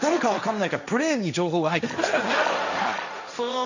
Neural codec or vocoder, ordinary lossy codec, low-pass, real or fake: codec, 16 kHz, 1.1 kbps, Voila-Tokenizer; none; 7.2 kHz; fake